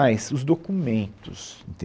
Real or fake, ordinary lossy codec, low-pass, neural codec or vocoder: real; none; none; none